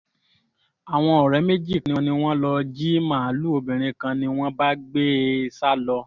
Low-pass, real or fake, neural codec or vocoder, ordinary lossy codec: 7.2 kHz; real; none; none